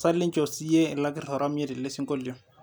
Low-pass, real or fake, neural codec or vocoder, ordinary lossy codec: none; real; none; none